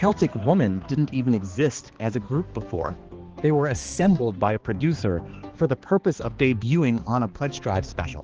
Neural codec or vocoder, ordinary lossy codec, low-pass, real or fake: codec, 16 kHz, 2 kbps, X-Codec, HuBERT features, trained on balanced general audio; Opus, 16 kbps; 7.2 kHz; fake